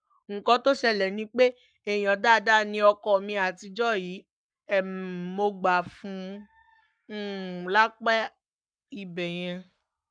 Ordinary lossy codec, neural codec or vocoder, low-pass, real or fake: none; codec, 44.1 kHz, 7.8 kbps, Pupu-Codec; 9.9 kHz; fake